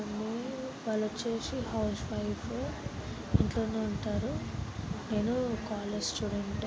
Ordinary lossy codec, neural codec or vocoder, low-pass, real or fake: none; none; none; real